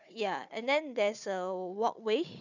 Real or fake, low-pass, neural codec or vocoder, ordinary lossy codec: fake; 7.2 kHz; codec, 16 kHz, 4 kbps, FunCodec, trained on Chinese and English, 50 frames a second; none